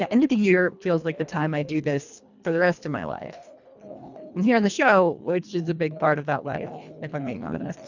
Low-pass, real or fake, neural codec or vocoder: 7.2 kHz; fake; codec, 24 kHz, 1.5 kbps, HILCodec